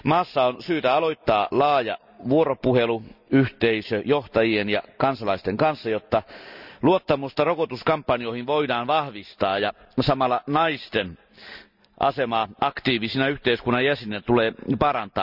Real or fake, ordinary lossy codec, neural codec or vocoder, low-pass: real; none; none; 5.4 kHz